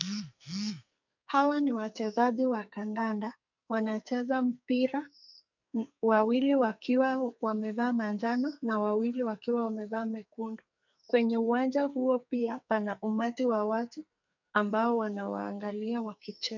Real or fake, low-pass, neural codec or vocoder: fake; 7.2 kHz; codec, 44.1 kHz, 2.6 kbps, SNAC